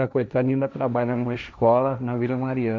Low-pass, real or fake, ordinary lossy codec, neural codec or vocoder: none; fake; none; codec, 16 kHz, 1.1 kbps, Voila-Tokenizer